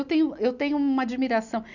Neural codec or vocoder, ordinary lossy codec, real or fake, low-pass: none; none; real; 7.2 kHz